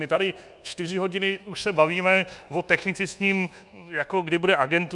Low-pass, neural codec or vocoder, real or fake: 10.8 kHz; codec, 24 kHz, 1.2 kbps, DualCodec; fake